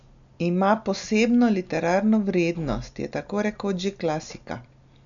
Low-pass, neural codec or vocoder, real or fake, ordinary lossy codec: 7.2 kHz; none; real; none